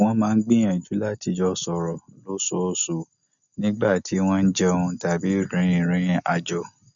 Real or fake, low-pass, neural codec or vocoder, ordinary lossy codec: real; 7.2 kHz; none; none